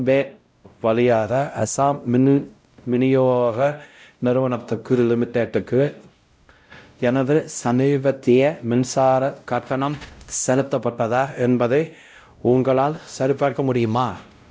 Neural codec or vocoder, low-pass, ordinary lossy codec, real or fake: codec, 16 kHz, 0.5 kbps, X-Codec, WavLM features, trained on Multilingual LibriSpeech; none; none; fake